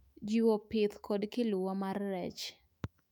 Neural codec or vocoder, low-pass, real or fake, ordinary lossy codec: autoencoder, 48 kHz, 128 numbers a frame, DAC-VAE, trained on Japanese speech; 19.8 kHz; fake; none